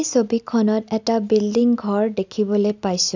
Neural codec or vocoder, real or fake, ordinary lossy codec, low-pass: none; real; none; 7.2 kHz